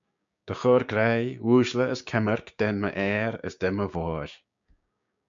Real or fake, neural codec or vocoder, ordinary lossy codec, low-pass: fake; codec, 16 kHz, 6 kbps, DAC; MP3, 64 kbps; 7.2 kHz